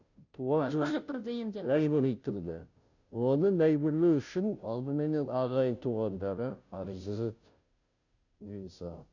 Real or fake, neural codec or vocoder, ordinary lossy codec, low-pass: fake; codec, 16 kHz, 0.5 kbps, FunCodec, trained on Chinese and English, 25 frames a second; none; 7.2 kHz